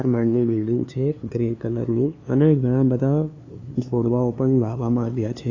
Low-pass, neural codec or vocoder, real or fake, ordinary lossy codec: 7.2 kHz; codec, 16 kHz, 2 kbps, FunCodec, trained on LibriTTS, 25 frames a second; fake; AAC, 32 kbps